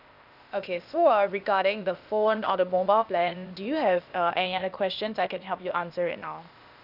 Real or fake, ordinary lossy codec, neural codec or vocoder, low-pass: fake; none; codec, 16 kHz, 0.8 kbps, ZipCodec; 5.4 kHz